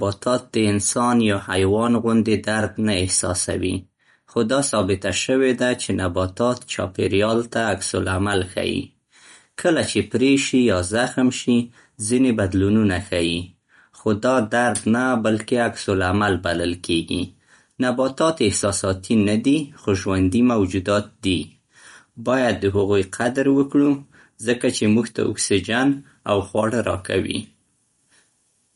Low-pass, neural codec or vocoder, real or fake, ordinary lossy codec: 19.8 kHz; none; real; MP3, 48 kbps